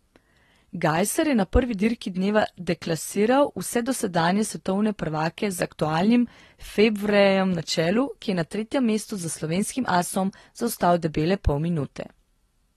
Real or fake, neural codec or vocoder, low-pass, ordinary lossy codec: real; none; 19.8 kHz; AAC, 32 kbps